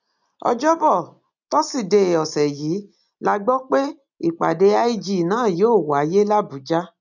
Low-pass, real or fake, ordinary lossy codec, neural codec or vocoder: 7.2 kHz; real; none; none